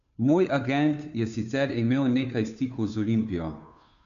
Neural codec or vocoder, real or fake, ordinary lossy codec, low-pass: codec, 16 kHz, 2 kbps, FunCodec, trained on Chinese and English, 25 frames a second; fake; MP3, 96 kbps; 7.2 kHz